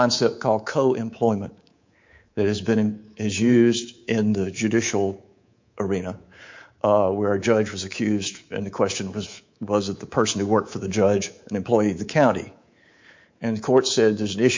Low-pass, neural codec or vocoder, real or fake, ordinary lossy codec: 7.2 kHz; codec, 24 kHz, 3.1 kbps, DualCodec; fake; MP3, 48 kbps